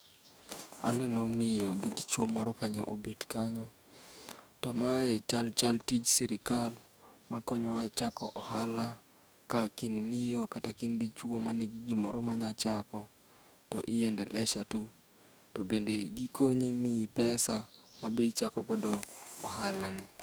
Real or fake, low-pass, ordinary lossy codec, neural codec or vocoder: fake; none; none; codec, 44.1 kHz, 2.6 kbps, DAC